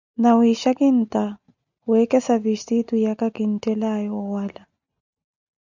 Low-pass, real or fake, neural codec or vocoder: 7.2 kHz; real; none